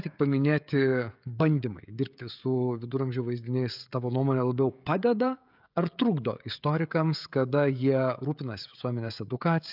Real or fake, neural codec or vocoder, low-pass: fake; codec, 16 kHz, 16 kbps, FreqCodec, smaller model; 5.4 kHz